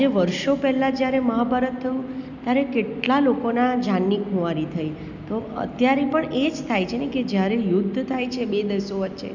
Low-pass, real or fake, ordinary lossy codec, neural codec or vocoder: 7.2 kHz; real; none; none